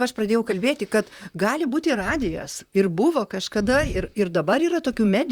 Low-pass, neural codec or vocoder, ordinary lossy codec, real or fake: 19.8 kHz; vocoder, 44.1 kHz, 128 mel bands, Pupu-Vocoder; Opus, 64 kbps; fake